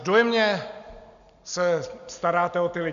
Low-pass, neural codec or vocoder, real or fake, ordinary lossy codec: 7.2 kHz; none; real; MP3, 96 kbps